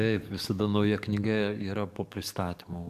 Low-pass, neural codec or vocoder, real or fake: 14.4 kHz; codec, 44.1 kHz, 7.8 kbps, DAC; fake